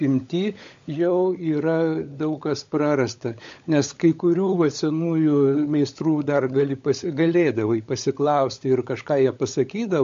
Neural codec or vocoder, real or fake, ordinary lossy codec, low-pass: codec, 16 kHz, 16 kbps, FunCodec, trained on LibriTTS, 50 frames a second; fake; MP3, 48 kbps; 7.2 kHz